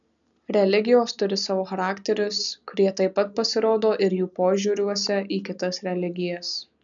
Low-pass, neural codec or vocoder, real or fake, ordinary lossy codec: 7.2 kHz; none; real; MP3, 96 kbps